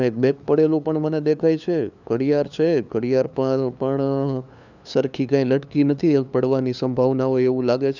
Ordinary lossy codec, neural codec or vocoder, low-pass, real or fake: none; codec, 16 kHz, 2 kbps, FunCodec, trained on LibriTTS, 25 frames a second; 7.2 kHz; fake